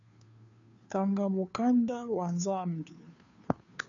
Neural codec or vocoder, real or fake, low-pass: codec, 16 kHz, 2 kbps, FunCodec, trained on Chinese and English, 25 frames a second; fake; 7.2 kHz